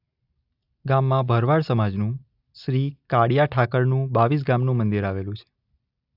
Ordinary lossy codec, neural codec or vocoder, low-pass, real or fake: AAC, 48 kbps; none; 5.4 kHz; real